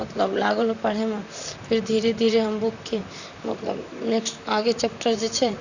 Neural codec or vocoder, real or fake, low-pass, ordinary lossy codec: vocoder, 44.1 kHz, 128 mel bands, Pupu-Vocoder; fake; 7.2 kHz; none